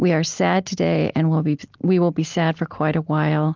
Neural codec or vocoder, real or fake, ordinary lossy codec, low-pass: none; real; Opus, 32 kbps; 7.2 kHz